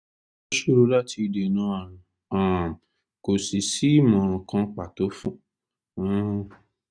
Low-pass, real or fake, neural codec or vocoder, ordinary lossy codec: 9.9 kHz; real; none; none